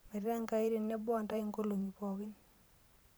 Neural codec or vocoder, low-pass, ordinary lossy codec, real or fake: vocoder, 44.1 kHz, 128 mel bands every 512 samples, BigVGAN v2; none; none; fake